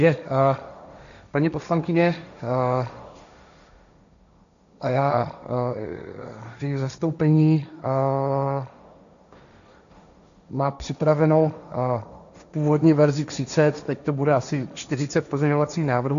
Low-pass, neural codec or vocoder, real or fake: 7.2 kHz; codec, 16 kHz, 1.1 kbps, Voila-Tokenizer; fake